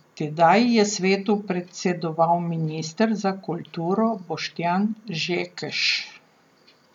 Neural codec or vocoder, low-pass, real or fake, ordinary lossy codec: none; 19.8 kHz; real; none